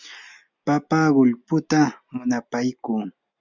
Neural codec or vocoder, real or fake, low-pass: none; real; 7.2 kHz